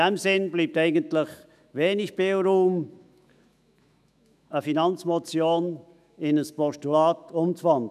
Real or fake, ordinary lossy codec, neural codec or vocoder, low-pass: fake; none; autoencoder, 48 kHz, 128 numbers a frame, DAC-VAE, trained on Japanese speech; 14.4 kHz